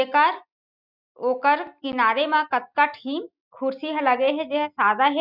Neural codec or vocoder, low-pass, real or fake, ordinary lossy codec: none; 5.4 kHz; real; none